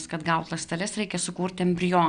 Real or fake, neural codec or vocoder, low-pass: real; none; 9.9 kHz